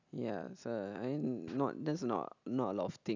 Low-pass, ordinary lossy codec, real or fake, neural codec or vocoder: 7.2 kHz; none; real; none